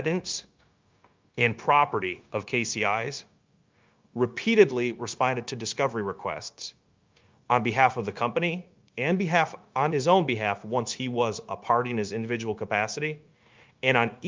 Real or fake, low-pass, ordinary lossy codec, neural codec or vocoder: fake; 7.2 kHz; Opus, 24 kbps; codec, 16 kHz, 0.9 kbps, LongCat-Audio-Codec